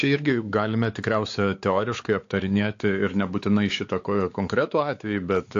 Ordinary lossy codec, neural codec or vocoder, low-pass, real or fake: AAC, 48 kbps; codec, 16 kHz, 4 kbps, X-Codec, WavLM features, trained on Multilingual LibriSpeech; 7.2 kHz; fake